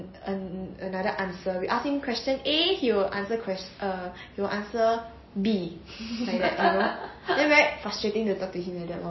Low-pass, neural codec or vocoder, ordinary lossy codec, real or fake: 7.2 kHz; none; MP3, 24 kbps; real